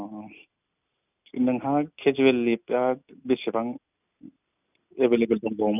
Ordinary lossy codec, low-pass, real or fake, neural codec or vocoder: none; 3.6 kHz; real; none